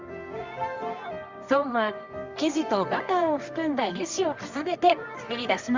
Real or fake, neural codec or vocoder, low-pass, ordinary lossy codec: fake; codec, 24 kHz, 0.9 kbps, WavTokenizer, medium music audio release; 7.2 kHz; Opus, 32 kbps